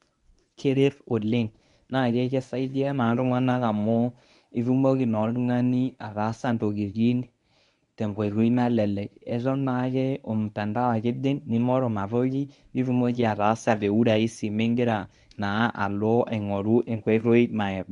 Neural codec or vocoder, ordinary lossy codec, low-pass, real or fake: codec, 24 kHz, 0.9 kbps, WavTokenizer, medium speech release version 1; MP3, 96 kbps; 10.8 kHz; fake